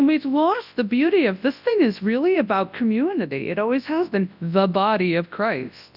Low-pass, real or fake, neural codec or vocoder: 5.4 kHz; fake; codec, 24 kHz, 0.9 kbps, WavTokenizer, large speech release